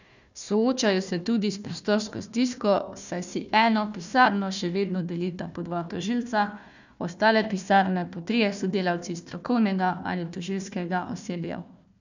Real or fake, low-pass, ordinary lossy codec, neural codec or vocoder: fake; 7.2 kHz; none; codec, 16 kHz, 1 kbps, FunCodec, trained on Chinese and English, 50 frames a second